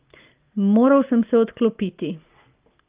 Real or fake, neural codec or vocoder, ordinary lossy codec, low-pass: real; none; Opus, 24 kbps; 3.6 kHz